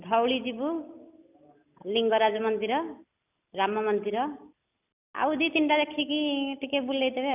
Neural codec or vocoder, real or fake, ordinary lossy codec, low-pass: none; real; none; 3.6 kHz